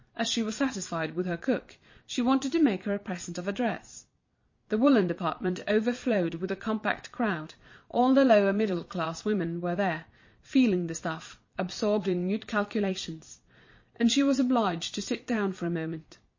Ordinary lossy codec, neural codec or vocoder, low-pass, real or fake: MP3, 32 kbps; vocoder, 22.05 kHz, 80 mel bands, Vocos; 7.2 kHz; fake